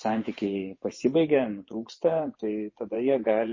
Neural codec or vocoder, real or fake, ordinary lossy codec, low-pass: vocoder, 24 kHz, 100 mel bands, Vocos; fake; MP3, 32 kbps; 7.2 kHz